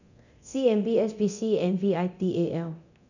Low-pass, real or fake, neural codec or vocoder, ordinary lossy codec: 7.2 kHz; fake; codec, 24 kHz, 0.9 kbps, DualCodec; none